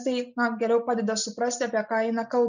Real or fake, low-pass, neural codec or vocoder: real; 7.2 kHz; none